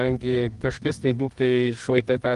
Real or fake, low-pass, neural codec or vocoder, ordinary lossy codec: fake; 10.8 kHz; codec, 24 kHz, 0.9 kbps, WavTokenizer, medium music audio release; Opus, 24 kbps